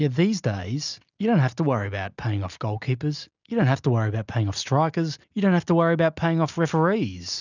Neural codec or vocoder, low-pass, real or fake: none; 7.2 kHz; real